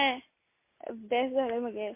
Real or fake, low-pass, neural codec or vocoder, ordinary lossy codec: real; 3.6 kHz; none; MP3, 24 kbps